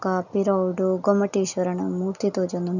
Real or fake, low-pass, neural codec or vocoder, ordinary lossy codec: real; 7.2 kHz; none; none